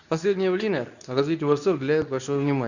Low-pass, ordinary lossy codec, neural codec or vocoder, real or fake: 7.2 kHz; none; codec, 24 kHz, 0.9 kbps, WavTokenizer, medium speech release version 2; fake